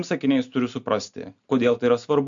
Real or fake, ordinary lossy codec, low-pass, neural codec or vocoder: real; MP3, 64 kbps; 7.2 kHz; none